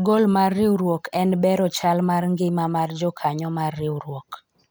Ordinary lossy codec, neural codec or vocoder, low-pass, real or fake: none; none; none; real